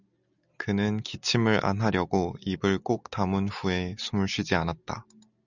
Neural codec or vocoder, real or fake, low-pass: none; real; 7.2 kHz